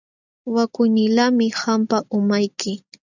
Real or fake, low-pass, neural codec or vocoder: real; 7.2 kHz; none